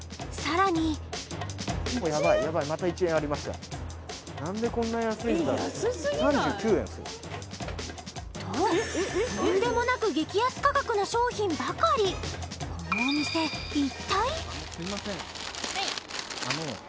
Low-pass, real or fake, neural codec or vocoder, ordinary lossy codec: none; real; none; none